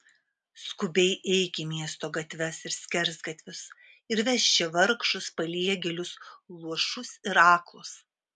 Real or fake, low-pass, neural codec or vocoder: real; 10.8 kHz; none